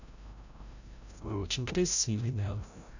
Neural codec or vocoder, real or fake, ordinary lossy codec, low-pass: codec, 16 kHz, 0.5 kbps, FreqCodec, larger model; fake; none; 7.2 kHz